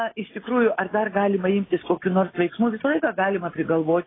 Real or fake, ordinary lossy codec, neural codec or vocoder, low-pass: real; AAC, 16 kbps; none; 7.2 kHz